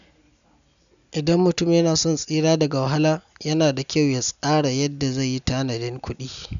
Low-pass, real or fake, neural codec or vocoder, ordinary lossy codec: 7.2 kHz; real; none; none